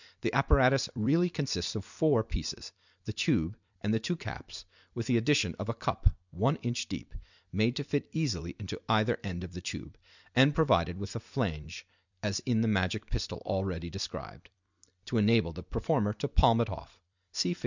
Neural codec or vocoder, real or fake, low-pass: vocoder, 44.1 kHz, 128 mel bands every 256 samples, BigVGAN v2; fake; 7.2 kHz